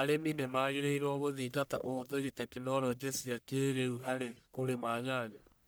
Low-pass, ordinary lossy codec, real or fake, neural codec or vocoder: none; none; fake; codec, 44.1 kHz, 1.7 kbps, Pupu-Codec